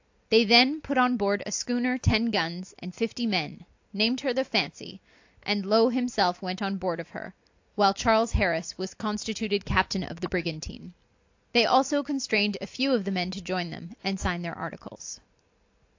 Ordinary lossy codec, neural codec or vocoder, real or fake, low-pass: AAC, 48 kbps; none; real; 7.2 kHz